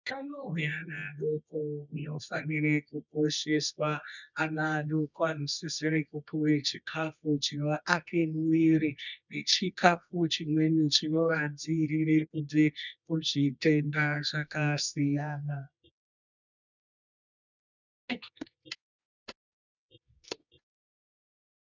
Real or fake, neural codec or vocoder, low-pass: fake; codec, 24 kHz, 0.9 kbps, WavTokenizer, medium music audio release; 7.2 kHz